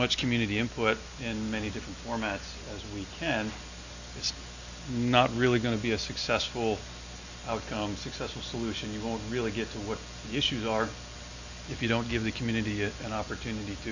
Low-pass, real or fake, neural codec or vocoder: 7.2 kHz; real; none